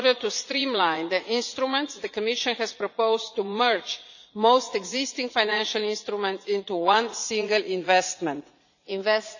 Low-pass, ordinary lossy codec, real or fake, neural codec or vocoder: 7.2 kHz; none; fake; vocoder, 44.1 kHz, 80 mel bands, Vocos